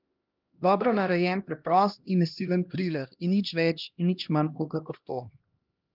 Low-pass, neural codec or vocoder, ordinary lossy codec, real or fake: 5.4 kHz; codec, 16 kHz, 1 kbps, X-Codec, HuBERT features, trained on LibriSpeech; Opus, 24 kbps; fake